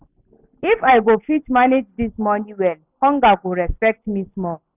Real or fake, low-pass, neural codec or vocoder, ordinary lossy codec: real; 3.6 kHz; none; none